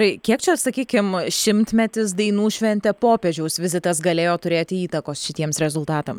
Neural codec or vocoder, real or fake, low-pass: none; real; 19.8 kHz